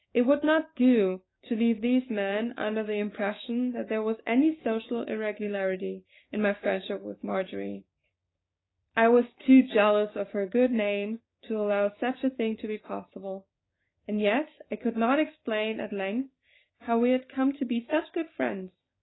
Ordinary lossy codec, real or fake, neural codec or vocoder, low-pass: AAC, 16 kbps; fake; codec, 16 kHz, 6 kbps, DAC; 7.2 kHz